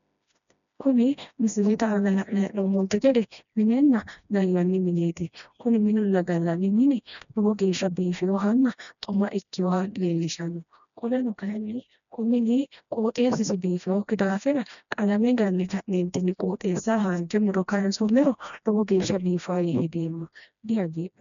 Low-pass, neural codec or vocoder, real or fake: 7.2 kHz; codec, 16 kHz, 1 kbps, FreqCodec, smaller model; fake